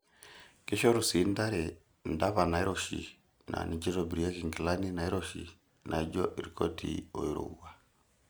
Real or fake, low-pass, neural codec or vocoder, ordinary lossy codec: real; none; none; none